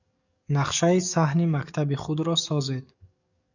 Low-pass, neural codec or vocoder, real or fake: 7.2 kHz; codec, 44.1 kHz, 7.8 kbps, DAC; fake